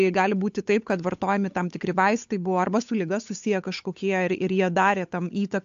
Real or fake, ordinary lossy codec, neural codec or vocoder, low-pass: fake; AAC, 64 kbps; codec, 16 kHz, 8 kbps, FunCodec, trained on Chinese and English, 25 frames a second; 7.2 kHz